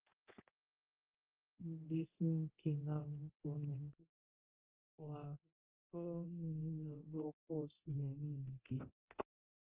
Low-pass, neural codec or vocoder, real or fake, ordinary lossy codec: 3.6 kHz; codec, 24 kHz, 0.9 kbps, DualCodec; fake; Opus, 16 kbps